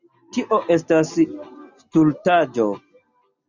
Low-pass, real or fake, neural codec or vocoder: 7.2 kHz; real; none